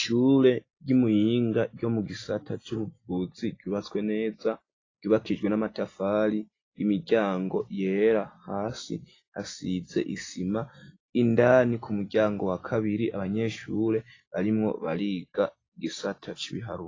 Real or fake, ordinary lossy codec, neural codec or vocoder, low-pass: real; AAC, 32 kbps; none; 7.2 kHz